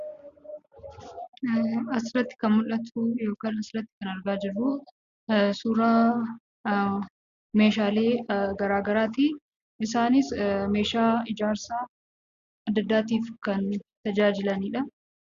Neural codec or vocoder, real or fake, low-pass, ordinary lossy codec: none; real; 7.2 kHz; Opus, 64 kbps